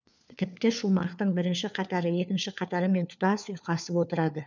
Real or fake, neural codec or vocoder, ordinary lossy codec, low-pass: fake; codec, 16 kHz, 4 kbps, FunCodec, trained on LibriTTS, 50 frames a second; none; 7.2 kHz